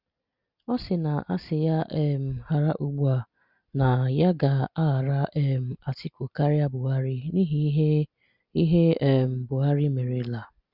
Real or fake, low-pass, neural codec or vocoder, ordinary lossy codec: real; 5.4 kHz; none; Opus, 64 kbps